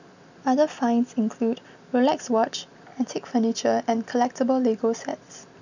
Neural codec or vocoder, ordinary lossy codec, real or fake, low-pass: none; none; real; 7.2 kHz